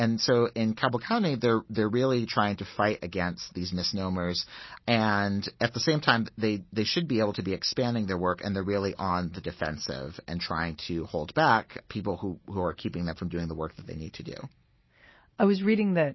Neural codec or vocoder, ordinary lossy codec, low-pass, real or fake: none; MP3, 24 kbps; 7.2 kHz; real